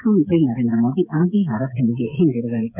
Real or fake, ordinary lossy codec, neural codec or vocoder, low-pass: fake; none; codec, 16 kHz, 8 kbps, FreqCodec, smaller model; 3.6 kHz